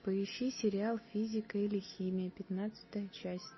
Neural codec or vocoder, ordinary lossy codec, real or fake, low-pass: none; MP3, 24 kbps; real; 7.2 kHz